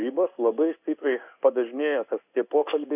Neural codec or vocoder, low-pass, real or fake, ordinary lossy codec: codec, 16 kHz in and 24 kHz out, 1 kbps, XY-Tokenizer; 3.6 kHz; fake; AAC, 32 kbps